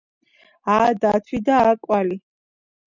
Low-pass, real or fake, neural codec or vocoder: 7.2 kHz; real; none